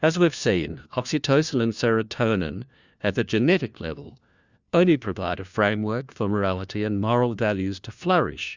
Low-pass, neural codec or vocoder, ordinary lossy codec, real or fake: 7.2 kHz; codec, 16 kHz, 1 kbps, FunCodec, trained on LibriTTS, 50 frames a second; Opus, 64 kbps; fake